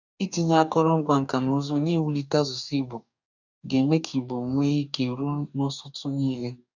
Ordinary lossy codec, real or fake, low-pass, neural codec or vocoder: none; fake; 7.2 kHz; codec, 44.1 kHz, 2.6 kbps, DAC